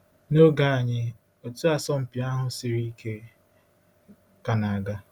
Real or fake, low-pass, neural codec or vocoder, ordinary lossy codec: real; 19.8 kHz; none; none